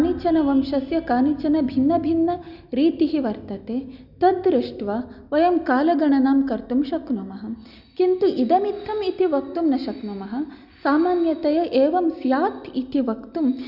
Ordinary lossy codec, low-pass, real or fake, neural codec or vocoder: Opus, 64 kbps; 5.4 kHz; real; none